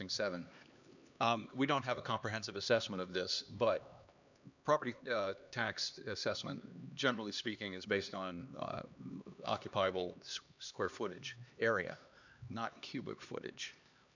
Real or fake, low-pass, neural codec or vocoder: fake; 7.2 kHz; codec, 16 kHz, 2 kbps, X-Codec, HuBERT features, trained on LibriSpeech